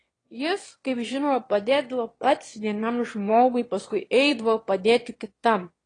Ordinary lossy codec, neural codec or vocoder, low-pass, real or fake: AAC, 32 kbps; autoencoder, 22.05 kHz, a latent of 192 numbers a frame, VITS, trained on one speaker; 9.9 kHz; fake